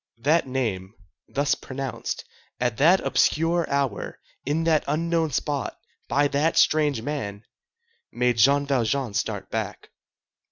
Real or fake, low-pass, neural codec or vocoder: real; 7.2 kHz; none